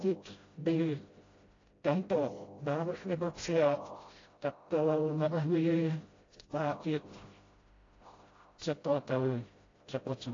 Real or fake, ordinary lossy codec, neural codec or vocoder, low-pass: fake; AAC, 32 kbps; codec, 16 kHz, 0.5 kbps, FreqCodec, smaller model; 7.2 kHz